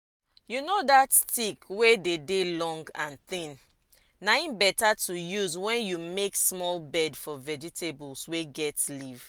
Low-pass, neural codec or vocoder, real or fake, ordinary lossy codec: none; none; real; none